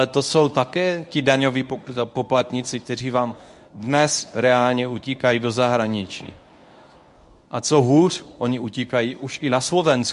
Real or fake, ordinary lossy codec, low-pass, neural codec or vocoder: fake; MP3, 64 kbps; 10.8 kHz; codec, 24 kHz, 0.9 kbps, WavTokenizer, medium speech release version 1